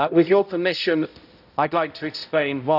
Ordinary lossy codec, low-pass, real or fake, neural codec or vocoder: none; 5.4 kHz; fake; codec, 16 kHz, 0.5 kbps, X-Codec, HuBERT features, trained on balanced general audio